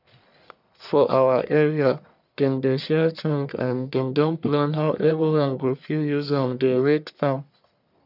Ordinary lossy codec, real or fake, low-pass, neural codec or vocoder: none; fake; 5.4 kHz; codec, 44.1 kHz, 1.7 kbps, Pupu-Codec